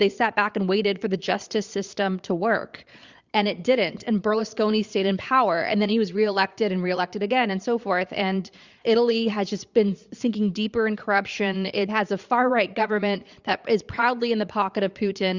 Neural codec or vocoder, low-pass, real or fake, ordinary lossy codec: vocoder, 22.05 kHz, 80 mel bands, Vocos; 7.2 kHz; fake; Opus, 64 kbps